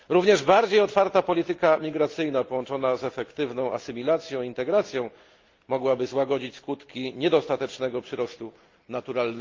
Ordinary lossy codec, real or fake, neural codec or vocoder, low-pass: Opus, 32 kbps; real; none; 7.2 kHz